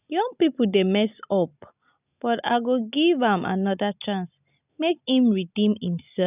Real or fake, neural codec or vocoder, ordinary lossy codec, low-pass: real; none; none; 3.6 kHz